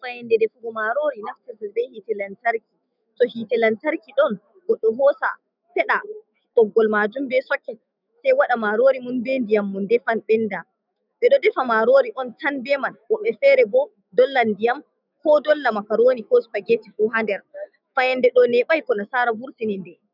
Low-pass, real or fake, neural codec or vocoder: 5.4 kHz; fake; autoencoder, 48 kHz, 128 numbers a frame, DAC-VAE, trained on Japanese speech